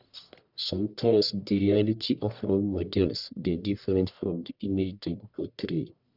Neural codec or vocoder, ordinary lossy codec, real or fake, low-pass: codec, 44.1 kHz, 1.7 kbps, Pupu-Codec; none; fake; 5.4 kHz